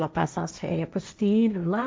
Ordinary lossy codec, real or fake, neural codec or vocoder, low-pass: none; fake; codec, 16 kHz, 1.1 kbps, Voila-Tokenizer; none